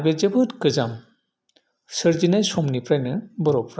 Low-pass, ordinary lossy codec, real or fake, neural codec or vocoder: none; none; real; none